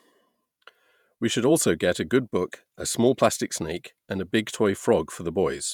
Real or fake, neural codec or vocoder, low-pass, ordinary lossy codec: fake; vocoder, 48 kHz, 128 mel bands, Vocos; 19.8 kHz; none